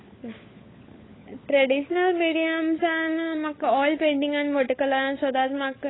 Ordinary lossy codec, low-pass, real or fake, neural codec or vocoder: AAC, 16 kbps; 7.2 kHz; fake; codec, 16 kHz, 16 kbps, FunCodec, trained on LibriTTS, 50 frames a second